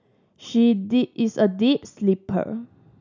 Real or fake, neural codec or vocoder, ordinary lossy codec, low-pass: real; none; none; 7.2 kHz